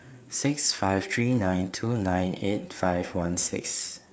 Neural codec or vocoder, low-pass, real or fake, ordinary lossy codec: codec, 16 kHz, 4 kbps, FreqCodec, larger model; none; fake; none